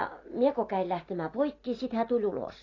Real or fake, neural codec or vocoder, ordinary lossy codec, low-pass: real; none; AAC, 32 kbps; 7.2 kHz